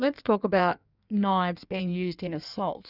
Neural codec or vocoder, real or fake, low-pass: codec, 16 kHz in and 24 kHz out, 1.1 kbps, FireRedTTS-2 codec; fake; 5.4 kHz